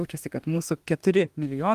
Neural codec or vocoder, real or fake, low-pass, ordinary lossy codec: autoencoder, 48 kHz, 32 numbers a frame, DAC-VAE, trained on Japanese speech; fake; 14.4 kHz; Opus, 24 kbps